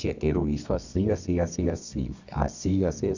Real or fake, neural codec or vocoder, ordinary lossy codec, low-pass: fake; codec, 24 kHz, 0.9 kbps, WavTokenizer, medium music audio release; none; 7.2 kHz